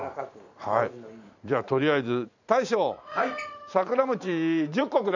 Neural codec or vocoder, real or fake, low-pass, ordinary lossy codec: vocoder, 44.1 kHz, 128 mel bands every 512 samples, BigVGAN v2; fake; 7.2 kHz; none